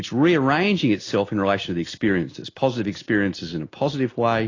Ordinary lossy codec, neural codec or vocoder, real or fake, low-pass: AAC, 32 kbps; none; real; 7.2 kHz